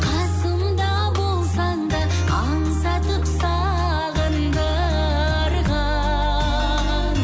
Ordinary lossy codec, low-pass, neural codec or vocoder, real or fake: none; none; none; real